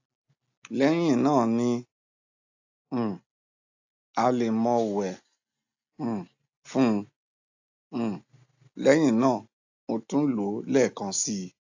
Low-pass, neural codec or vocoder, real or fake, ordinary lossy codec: 7.2 kHz; none; real; AAC, 48 kbps